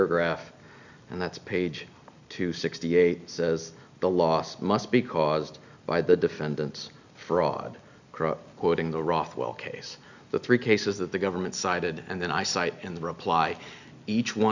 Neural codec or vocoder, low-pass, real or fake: none; 7.2 kHz; real